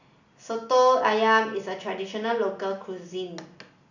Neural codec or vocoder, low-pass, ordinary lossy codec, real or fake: none; 7.2 kHz; none; real